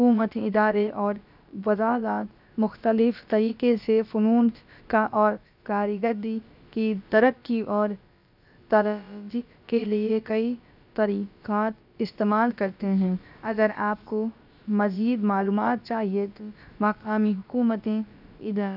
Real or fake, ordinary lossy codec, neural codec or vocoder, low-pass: fake; none; codec, 16 kHz, about 1 kbps, DyCAST, with the encoder's durations; 5.4 kHz